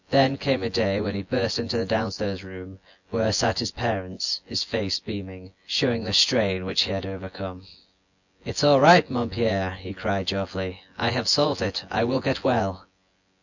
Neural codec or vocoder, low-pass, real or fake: vocoder, 24 kHz, 100 mel bands, Vocos; 7.2 kHz; fake